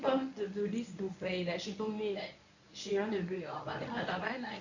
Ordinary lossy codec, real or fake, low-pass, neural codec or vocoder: none; fake; 7.2 kHz; codec, 24 kHz, 0.9 kbps, WavTokenizer, medium speech release version 1